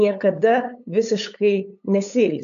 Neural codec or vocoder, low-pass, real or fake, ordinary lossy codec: codec, 16 kHz, 8 kbps, FunCodec, trained on LibriTTS, 25 frames a second; 7.2 kHz; fake; MP3, 64 kbps